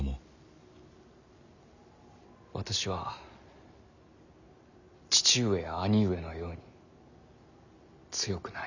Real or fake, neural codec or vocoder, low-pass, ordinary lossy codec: real; none; 7.2 kHz; none